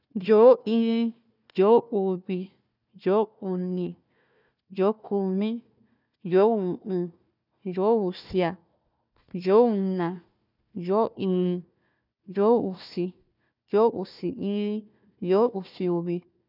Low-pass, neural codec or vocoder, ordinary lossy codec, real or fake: 5.4 kHz; codec, 16 kHz, 1 kbps, FunCodec, trained on Chinese and English, 50 frames a second; none; fake